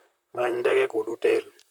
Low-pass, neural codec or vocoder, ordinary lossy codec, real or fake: 19.8 kHz; vocoder, 44.1 kHz, 128 mel bands, Pupu-Vocoder; none; fake